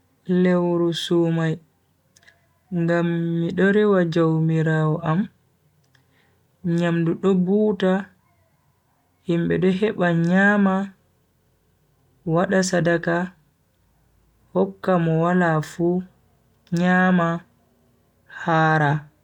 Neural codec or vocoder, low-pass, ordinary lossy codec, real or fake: none; 19.8 kHz; none; real